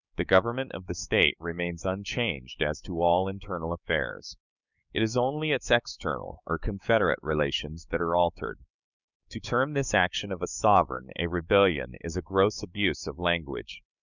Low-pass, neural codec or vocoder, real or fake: 7.2 kHz; codec, 16 kHz, 6 kbps, DAC; fake